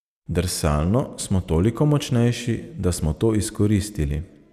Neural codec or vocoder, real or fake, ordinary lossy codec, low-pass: none; real; Opus, 64 kbps; 14.4 kHz